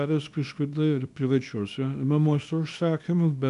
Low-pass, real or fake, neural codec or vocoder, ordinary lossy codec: 10.8 kHz; fake; codec, 24 kHz, 0.9 kbps, WavTokenizer, small release; MP3, 96 kbps